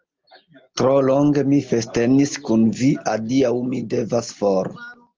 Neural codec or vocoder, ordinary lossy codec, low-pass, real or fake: none; Opus, 32 kbps; 7.2 kHz; real